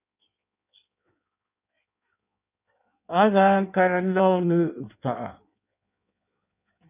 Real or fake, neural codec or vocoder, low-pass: fake; codec, 16 kHz in and 24 kHz out, 1.1 kbps, FireRedTTS-2 codec; 3.6 kHz